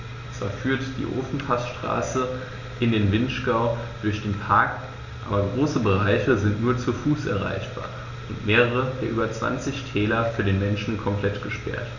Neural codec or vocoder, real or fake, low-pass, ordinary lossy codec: none; real; 7.2 kHz; none